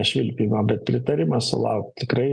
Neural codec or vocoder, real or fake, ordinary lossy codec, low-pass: none; real; Opus, 32 kbps; 9.9 kHz